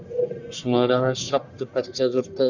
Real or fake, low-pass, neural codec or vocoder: fake; 7.2 kHz; codec, 44.1 kHz, 1.7 kbps, Pupu-Codec